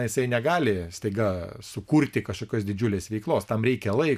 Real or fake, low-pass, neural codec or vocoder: real; 14.4 kHz; none